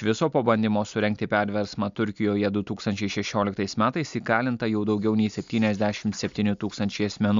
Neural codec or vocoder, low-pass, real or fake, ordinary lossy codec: none; 7.2 kHz; real; MP3, 64 kbps